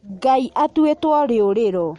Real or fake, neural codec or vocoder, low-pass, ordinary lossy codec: real; none; 19.8 kHz; MP3, 48 kbps